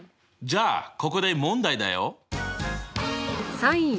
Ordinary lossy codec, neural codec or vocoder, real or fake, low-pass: none; none; real; none